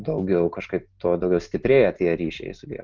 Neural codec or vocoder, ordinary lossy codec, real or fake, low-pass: none; Opus, 24 kbps; real; 7.2 kHz